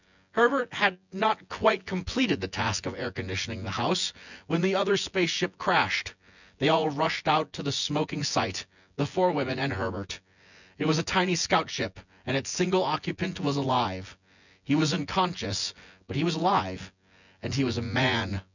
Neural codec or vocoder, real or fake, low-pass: vocoder, 24 kHz, 100 mel bands, Vocos; fake; 7.2 kHz